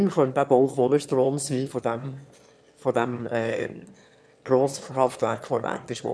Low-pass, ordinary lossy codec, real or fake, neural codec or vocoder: none; none; fake; autoencoder, 22.05 kHz, a latent of 192 numbers a frame, VITS, trained on one speaker